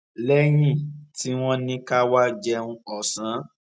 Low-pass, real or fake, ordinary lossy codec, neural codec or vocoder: none; real; none; none